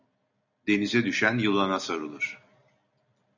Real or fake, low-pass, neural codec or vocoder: real; 7.2 kHz; none